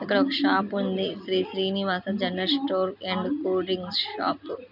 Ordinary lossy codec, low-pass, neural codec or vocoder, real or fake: none; 5.4 kHz; none; real